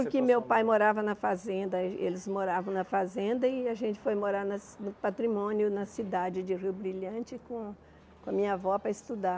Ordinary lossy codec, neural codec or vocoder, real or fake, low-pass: none; none; real; none